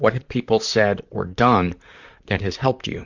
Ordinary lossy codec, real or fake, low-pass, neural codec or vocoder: Opus, 64 kbps; fake; 7.2 kHz; vocoder, 44.1 kHz, 128 mel bands, Pupu-Vocoder